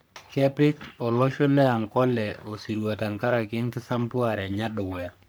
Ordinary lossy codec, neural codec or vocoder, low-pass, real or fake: none; codec, 44.1 kHz, 3.4 kbps, Pupu-Codec; none; fake